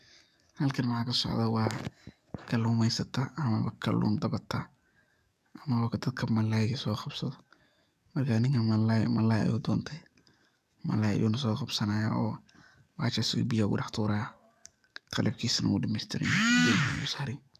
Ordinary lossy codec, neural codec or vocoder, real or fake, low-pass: none; codec, 44.1 kHz, 7.8 kbps, DAC; fake; 14.4 kHz